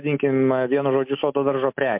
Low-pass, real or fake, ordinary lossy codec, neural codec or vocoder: 3.6 kHz; real; MP3, 32 kbps; none